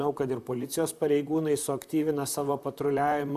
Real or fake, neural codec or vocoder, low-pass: fake; vocoder, 44.1 kHz, 128 mel bands, Pupu-Vocoder; 14.4 kHz